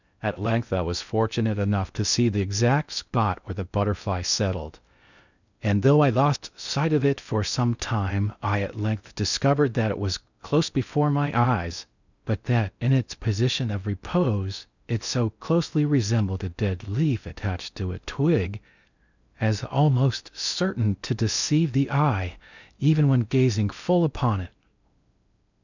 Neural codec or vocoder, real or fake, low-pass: codec, 16 kHz in and 24 kHz out, 0.6 kbps, FocalCodec, streaming, 2048 codes; fake; 7.2 kHz